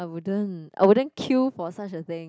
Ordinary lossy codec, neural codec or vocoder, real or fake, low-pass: none; none; real; none